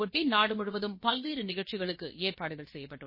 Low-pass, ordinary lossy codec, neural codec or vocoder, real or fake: 5.4 kHz; MP3, 24 kbps; codec, 16 kHz, about 1 kbps, DyCAST, with the encoder's durations; fake